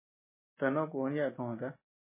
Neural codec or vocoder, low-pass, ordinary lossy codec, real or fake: none; 3.6 kHz; MP3, 16 kbps; real